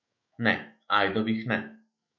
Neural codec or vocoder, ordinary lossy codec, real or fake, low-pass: autoencoder, 48 kHz, 128 numbers a frame, DAC-VAE, trained on Japanese speech; MP3, 48 kbps; fake; 7.2 kHz